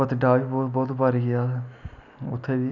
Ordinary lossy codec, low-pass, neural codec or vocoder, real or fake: none; 7.2 kHz; none; real